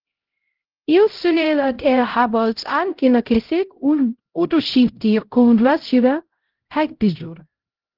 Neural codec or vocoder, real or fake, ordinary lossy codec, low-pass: codec, 16 kHz, 0.5 kbps, X-Codec, HuBERT features, trained on LibriSpeech; fake; Opus, 16 kbps; 5.4 kHz